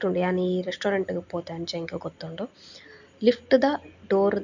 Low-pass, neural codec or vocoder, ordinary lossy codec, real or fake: 7.2 kHz; none; none; real